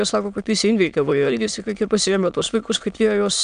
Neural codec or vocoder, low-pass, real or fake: autoencoder, 22.05 kHz, a latent of 192 numbers a frame, VITS, trained on many speakers; 9.9 kHz; fake